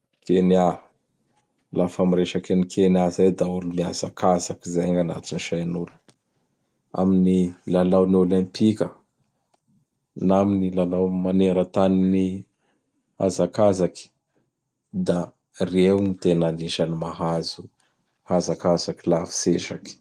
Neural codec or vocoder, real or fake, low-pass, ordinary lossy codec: none; real; 14.4 kHz; Opus, 24 kbps